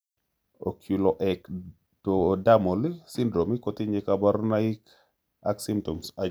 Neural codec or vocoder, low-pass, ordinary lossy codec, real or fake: none; none; none; real